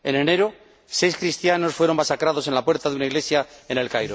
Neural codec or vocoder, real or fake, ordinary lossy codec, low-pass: none; real; none; none